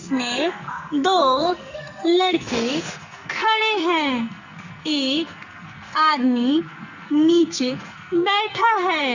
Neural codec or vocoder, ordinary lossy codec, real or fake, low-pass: codec, 44.1 kHz, 2.6 kbps, SNAC; Opus, 64 kbps; fake; 7.2 kHz